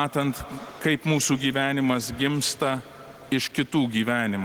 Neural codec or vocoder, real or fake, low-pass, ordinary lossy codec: none; real; 19.8 kHz; Opus, 16 kbps